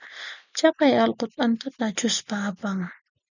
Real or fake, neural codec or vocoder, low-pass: real; none; 7.2 kHz